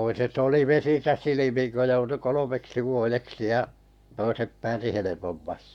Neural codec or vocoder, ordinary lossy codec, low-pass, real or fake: codec, 44.1 kHz, 7.8 kbps, Pupu-Codec; none; 19.8 kHz; fake